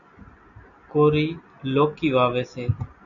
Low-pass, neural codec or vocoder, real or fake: 7.2 kHz; none; real